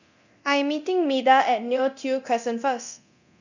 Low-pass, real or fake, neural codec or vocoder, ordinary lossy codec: 7.2 kHz; fake; codec, 24 kHz, 0.9 kbps, DualCodec; none